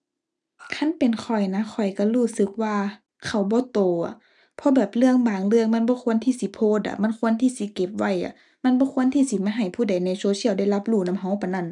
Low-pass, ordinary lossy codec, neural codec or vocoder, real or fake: 10.8 kHz; none; none; real